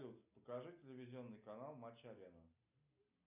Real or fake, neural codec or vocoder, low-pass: real; none; 3.6 kHz